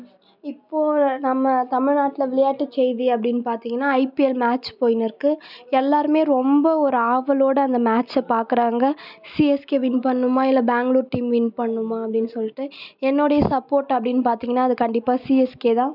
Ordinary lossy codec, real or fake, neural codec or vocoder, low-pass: none; real; none; 5.4 kHz